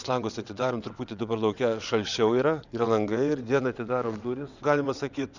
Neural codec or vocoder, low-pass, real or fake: vocoder, 22.05 kHz, 80 mel bands, WaveNeXt; 7.2 kHz; fake